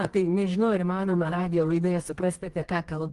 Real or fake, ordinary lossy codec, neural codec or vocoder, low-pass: fake; Opus, 24 kbps; codec, 24 kHz, 0.9 kbps, WavTokenizer, medium music audio release; 10.8 kHz